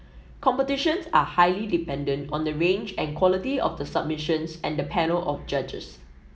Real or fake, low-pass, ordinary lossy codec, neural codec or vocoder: real; none; none; none